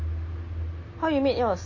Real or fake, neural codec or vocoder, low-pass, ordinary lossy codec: real; none; 7.2 kHz; MP3, 32 kbps